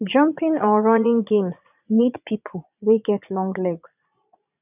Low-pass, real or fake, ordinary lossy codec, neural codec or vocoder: 3.6 kHz; fake; none; vocoder, 22.05 kHz, 80 mel bands, Vocos